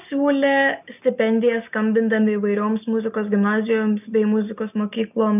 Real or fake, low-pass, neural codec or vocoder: real; 3.6 kHz; none